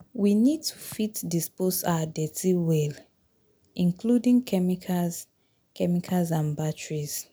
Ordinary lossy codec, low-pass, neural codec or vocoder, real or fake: none; none; none; real